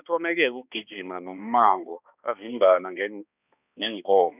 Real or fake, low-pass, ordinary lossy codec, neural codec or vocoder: fake; 3.6 kHz; none; codec, 16 kHz, 2 kbps, X-Codec, HuBERT features, trained on balanced general audio